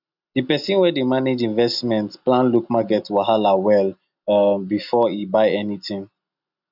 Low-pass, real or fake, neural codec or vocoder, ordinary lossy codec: 5.4 kHz; real; none; none